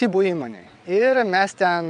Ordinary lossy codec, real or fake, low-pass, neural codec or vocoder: AAC, 96 kbps; fake; 9.9 kHz; vocoder, 22.05 kHz, 80 mel bands, WaveNeXt